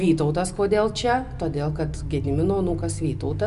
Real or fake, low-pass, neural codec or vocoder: real; 10.8 kHz; none